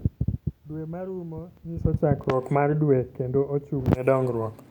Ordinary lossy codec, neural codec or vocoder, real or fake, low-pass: none; none; real; 19.8 kHz